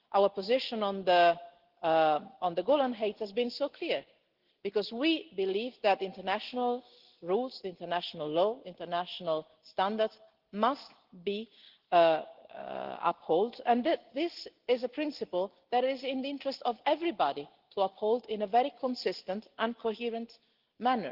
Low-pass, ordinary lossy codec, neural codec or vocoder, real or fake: 5.4 kHz; Opus, 16 kbps; none; real